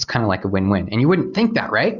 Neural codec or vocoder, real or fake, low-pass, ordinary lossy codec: none; real; 7.2 kHz; Opus, 64 kbps